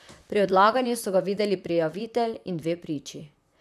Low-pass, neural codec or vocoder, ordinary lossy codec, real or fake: 14.4 kHz; vocoder, 44.1 kHz, 128 mel bands, Pupu-Vocoder; none; fake